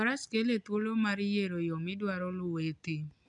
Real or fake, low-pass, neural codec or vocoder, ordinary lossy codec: real; 9.9 kHz; none; none